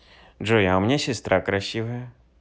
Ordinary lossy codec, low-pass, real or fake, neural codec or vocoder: none; none; real; none